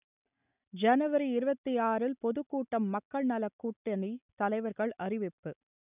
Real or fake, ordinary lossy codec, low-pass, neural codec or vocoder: real; none; 3.6 kHz; none